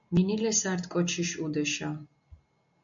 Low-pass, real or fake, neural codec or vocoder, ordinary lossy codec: 7.2 kHz; real; none; MP3, 96 kbps